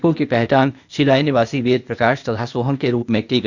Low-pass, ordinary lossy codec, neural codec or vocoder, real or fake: 7.2 kHz; none; codec, 16 kHz, 0.8 kbps, ZipCodec; fake